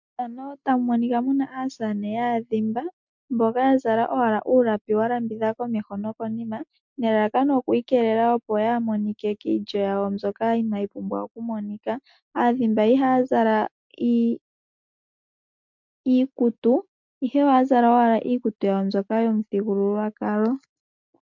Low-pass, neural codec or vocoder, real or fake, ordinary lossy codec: 7.2 kHz; none; real; MP3, 64 kbps